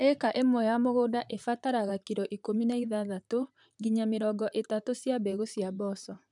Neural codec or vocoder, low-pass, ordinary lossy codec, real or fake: vocoder, 44.1 kHz, 128 mel bands, Pupu-Vocoder; 10.8 kHz; none; fake